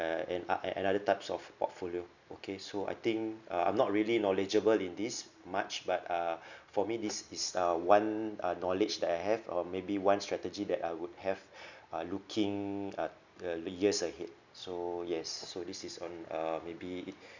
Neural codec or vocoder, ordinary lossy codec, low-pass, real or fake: none; none; 7.2 kHz; real